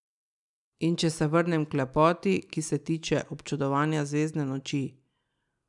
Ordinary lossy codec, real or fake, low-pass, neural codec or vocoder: none; real; 10.8 kHz; none